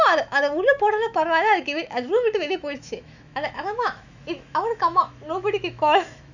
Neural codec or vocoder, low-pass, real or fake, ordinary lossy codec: autoencoder, 48 kHz, 128 numbers a frame, DAC-VAE, trained on Japanese speech; 7.2 kHz; fake; none